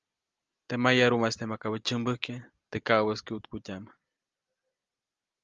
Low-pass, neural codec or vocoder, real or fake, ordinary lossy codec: 7.2 kHz; none; real; Opus, 32 kbps